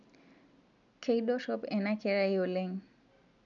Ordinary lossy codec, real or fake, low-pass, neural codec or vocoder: none; real; 7.2 kHz; none